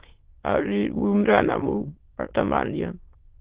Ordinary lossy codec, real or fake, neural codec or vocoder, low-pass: Opus, 16 kbps; fake; autoencoder, 22.05 kHz, a latent of 192 numbers a frame, VITS, trained on many speakers; 3.6 kHz